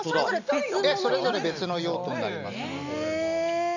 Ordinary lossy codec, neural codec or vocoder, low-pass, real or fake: none; none; 7.2 kHz; real